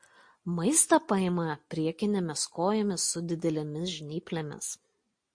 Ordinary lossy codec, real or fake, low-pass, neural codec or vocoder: MP3, 48 kbps; real; 9.9 kHz; none